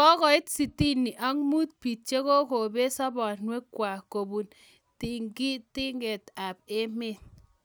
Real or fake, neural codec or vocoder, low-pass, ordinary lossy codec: real; none; none; none